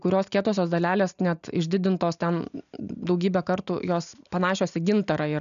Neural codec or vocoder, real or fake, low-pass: none; real; 7.2 kHz